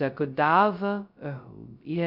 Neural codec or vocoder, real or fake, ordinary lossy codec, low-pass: codec, 16 kHz, 0.2 kbps, FocalCodec; fake; none; 5.4 kHz